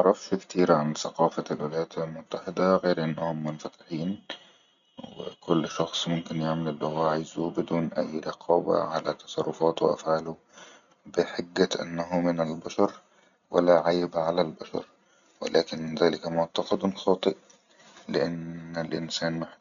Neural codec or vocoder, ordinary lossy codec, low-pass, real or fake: none; none; 7.2 kHz; real